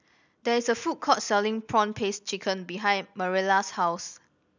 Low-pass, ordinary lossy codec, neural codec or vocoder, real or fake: 7.2 kHz; none; none; real